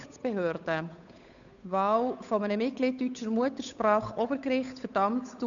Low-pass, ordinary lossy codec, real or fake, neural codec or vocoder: 7.2 kHz; none; fake; codec, 16 kHz, 8 kbps, FunCodec, trained on Chinese and English, 25 frames a second